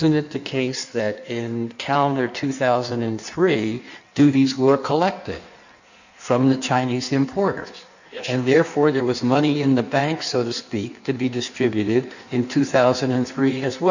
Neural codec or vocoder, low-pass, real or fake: codec, 16 kHz in and 24 kHz out, 1.1 kbps, FireRedTTS-2 codec; 7.2 kHz; fake